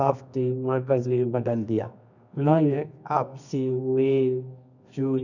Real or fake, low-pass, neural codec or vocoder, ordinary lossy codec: fake; 7.2 kHz; codec, 24 kHz, 0.9 kbps, WavTokenizer, medium music audio release; none